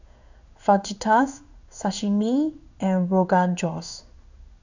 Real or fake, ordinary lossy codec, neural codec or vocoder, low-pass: fake; none; codec, 16 kHz in and 24 kHz out, 1 kbps, XY-Tokenizer; 7.2 kHz